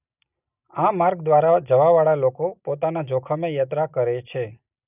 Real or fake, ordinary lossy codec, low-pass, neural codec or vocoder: real; none; 3.6 kHz; none